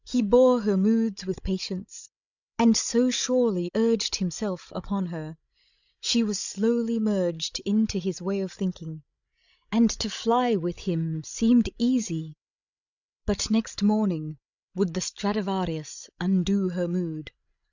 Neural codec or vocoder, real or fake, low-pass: codec, 16 kHz, 16 kbps, FreqCodec, larger model; fake; 7.2 kHz